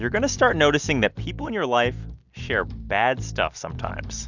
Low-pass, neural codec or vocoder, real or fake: 7.2 kHz; none; real